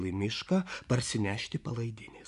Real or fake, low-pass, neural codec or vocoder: fake; 10.8 kHz; vocoder, 24 kHz, 100 mel bands, Vocos